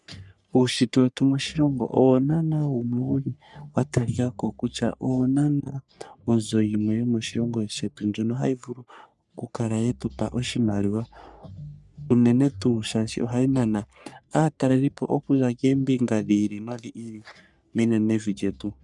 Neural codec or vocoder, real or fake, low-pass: codec, 44.1 kHz, 3.4 kbps, Pupu-Codec; fake; 10.8 kHz